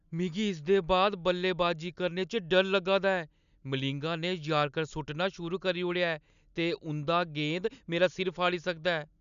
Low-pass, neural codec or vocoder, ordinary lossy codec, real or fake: 7.2 kHz; none; none; real